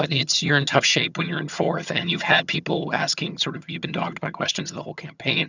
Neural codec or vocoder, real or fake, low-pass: vocoder, 22.05 kHz, 80 mel bands, HiFi-GAN; fake; 7.2 kHz